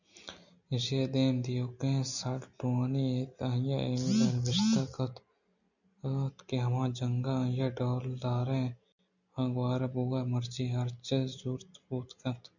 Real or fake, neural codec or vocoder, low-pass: real; none; 7.2 kHz